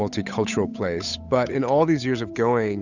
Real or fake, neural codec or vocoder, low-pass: fake; codec, 16 kHz, 8 kbps, FunCodec, trained on Chinese and English, 25 frames a second; 7.2 kHz